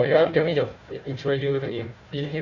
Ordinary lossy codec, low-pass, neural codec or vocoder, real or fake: none; 7.2 kHz; codec, 16 kHz, 1 kbps, FunCodec, trained on Chinese and English, 50 frames a second; fake